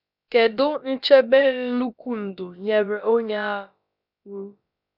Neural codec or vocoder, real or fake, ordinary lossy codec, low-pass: codec, 16 kHz, about 1 kbps, DyCAST, with the encoder's durations; fake; none; 5.4 kHz